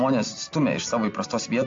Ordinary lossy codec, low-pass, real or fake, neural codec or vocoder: AAC, 48 kbps; 7.2 kHz; real; none